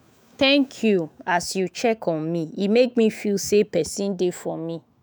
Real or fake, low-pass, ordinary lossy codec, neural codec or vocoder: fake; none; none; autoencoder, 48 kHz, 128 numbers a frame, DAC-VAE, trained on Japanese speech